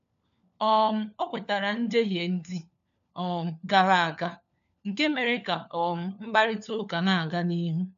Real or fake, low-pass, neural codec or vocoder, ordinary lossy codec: fake; 7.2 kHz; codec, 16 kHz, 4 kbps, FunCodec, trained on LibriTTS, 50 frames a second; AAC, 96 kbps